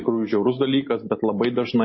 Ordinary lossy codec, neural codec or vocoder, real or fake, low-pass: MP3, 24 kbps; none; real; 7.2 kHz